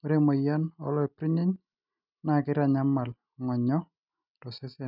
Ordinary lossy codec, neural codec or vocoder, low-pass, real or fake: none; none; 5.4 kHz; real